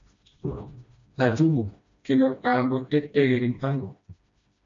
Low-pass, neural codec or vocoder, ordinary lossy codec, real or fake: 7.2 kHz; codec, 16 kHz, 1 kbps, FreqCodec, smaller model; MP3, 48 kbps; fake